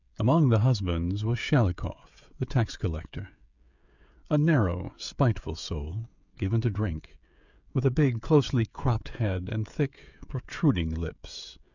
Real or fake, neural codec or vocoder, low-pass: fake; codec, 16 kHz, 16 kbps, FreqCodec, smaller model; 7.2 kHz